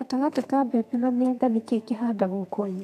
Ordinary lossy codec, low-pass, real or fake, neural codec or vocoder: none; 14.4 kHz; fake; codec, 32 kHz, 1.9 kbps, SNAC